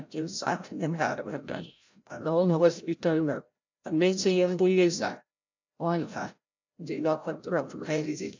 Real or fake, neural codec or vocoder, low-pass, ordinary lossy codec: fake; codec, 16 kHz, 0.5 kbps, FreqCodec, larger model; 7.2 kHz; MP3, 64 kbps